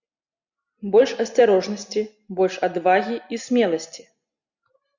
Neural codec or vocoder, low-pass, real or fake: none; 7.2 kHz; real